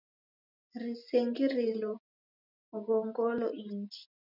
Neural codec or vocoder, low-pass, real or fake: none; 5.4 kHz; real